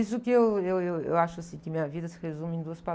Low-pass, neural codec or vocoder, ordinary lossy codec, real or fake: none; none; none; real